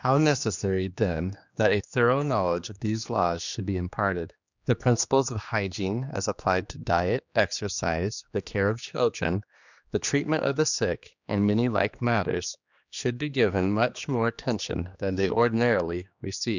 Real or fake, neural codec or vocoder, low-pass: fake; codec, 16 kHz, 2 kbps, X-Codec, HuBERT features, trained on general audio; 7.2 kHz